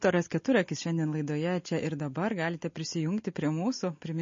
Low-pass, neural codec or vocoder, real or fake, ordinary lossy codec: 7.2 kHz; none; real; MP3, 32 kbps